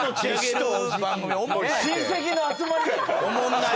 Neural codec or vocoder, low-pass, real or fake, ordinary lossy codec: none; none; real; none